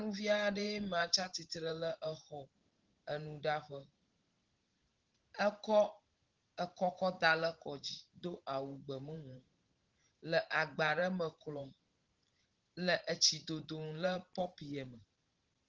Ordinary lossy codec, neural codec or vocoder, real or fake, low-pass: Opus, 16 kbps; vocoder, 44.1 kHz, 128 mel bands every 512 samples, BigVGAN v2; fake; 7.2 kHz